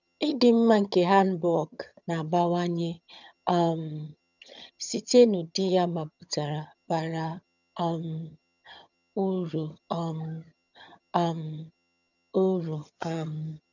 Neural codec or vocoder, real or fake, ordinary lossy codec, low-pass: vocoder, 22.05 kHz, 80 mel bands, HiFi-GAN; fake; none; 7.2 kHz